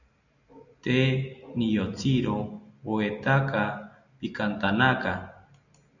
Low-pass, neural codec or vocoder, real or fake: 7.2 kHz; none; real